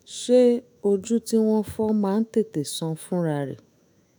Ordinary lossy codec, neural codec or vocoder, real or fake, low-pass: none; autoencoder, 48 kHz, 128 numbers a frame, DAC-VAE, trained on Japanese speech; fake; none